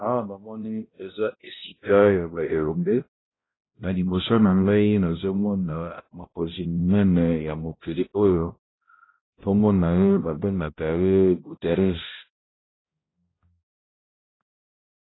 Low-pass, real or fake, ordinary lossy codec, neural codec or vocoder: 7.2 kHz; fake; AAC, 16 kbps; codec, 16 kHz, 0.5 kbps, X-Codec, HuBERT features, trained on balanced general audio